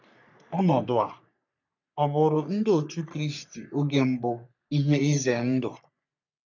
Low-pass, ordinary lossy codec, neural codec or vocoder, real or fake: 7.2 kHz; none; codec, 44.1 kHz, 3.4 kbps, Pupu-Codec; fake